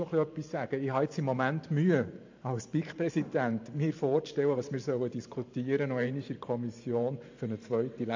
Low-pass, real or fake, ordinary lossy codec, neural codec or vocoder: 7.2 kHz; fake; AAC, 48 kbps; vocoder, 44.1 kHz, 128 mel bands every 512 samples, BigVGAN v2